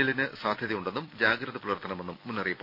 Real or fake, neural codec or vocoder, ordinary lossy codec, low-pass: real; none; none; 5.4 kHz